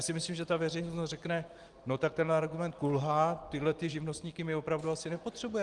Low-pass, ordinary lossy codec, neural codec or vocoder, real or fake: 10.8 kHz; Opus, 24 kbps; none; real